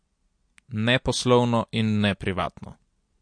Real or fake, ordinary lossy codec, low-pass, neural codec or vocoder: real; MP3, 48 kbps; 9.9 kHz; none